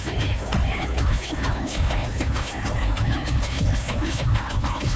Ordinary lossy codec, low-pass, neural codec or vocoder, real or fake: none; none; codec, 16 kHz, 1 kbps, FunCodec, trained on Chinese and English, 50 frames a second; fake